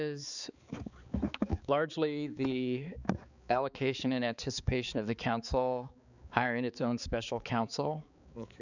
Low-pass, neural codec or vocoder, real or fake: 7.2 kHz; codec, 16 kHz, 4 kbps, X-Codec, HuBERT features, trained on balanced general audio; fake